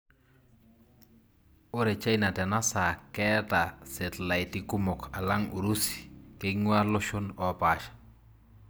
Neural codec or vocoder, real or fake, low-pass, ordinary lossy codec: none; real; none; none